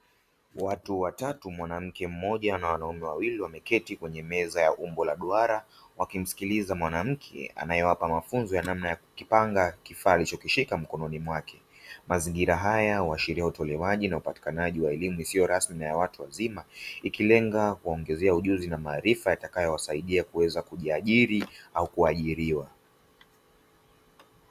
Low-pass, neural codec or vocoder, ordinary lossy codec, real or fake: 14.4 kHz; none; Opus, 64 kbps; real